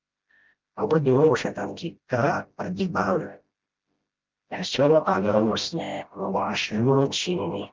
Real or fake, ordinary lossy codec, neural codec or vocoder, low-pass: fake; Opus, 32 kbps; codec, 16 kHz, 0.5 kbps, FreqCodec, smaller model; 7.2 kHz